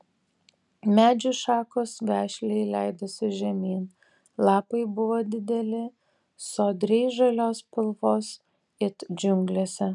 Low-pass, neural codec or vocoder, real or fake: 10.8 kHz; none; real